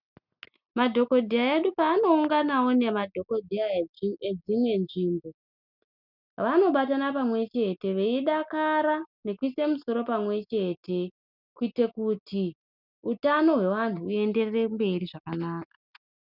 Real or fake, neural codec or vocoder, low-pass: real; none; 5.4 kHz